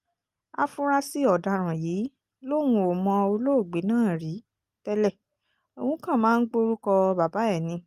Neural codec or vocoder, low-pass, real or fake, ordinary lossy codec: none; 14.4 kHz; real; Opus, 32 kbps